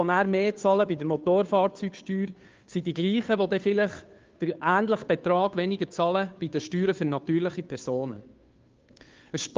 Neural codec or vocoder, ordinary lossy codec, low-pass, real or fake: codec, 16 kHz, 2 kbps, FunCodec, trained on Chinese and English, 25 frames a second; Opus, 24 kbps; 7.2 kHz; fake